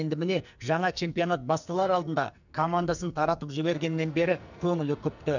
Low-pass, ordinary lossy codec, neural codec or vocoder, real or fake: 7.2 kHz; none; codec, 44.1 kHz, 2.6 kbps, SNAC; fake